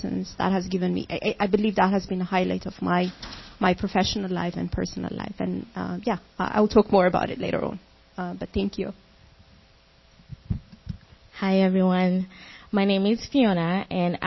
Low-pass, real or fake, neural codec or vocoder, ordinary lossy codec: 7.2 kHz; real; none; MP3, 24 kbps